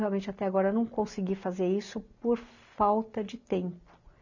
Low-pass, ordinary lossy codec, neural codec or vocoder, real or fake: 7.2 kHz; none; none; real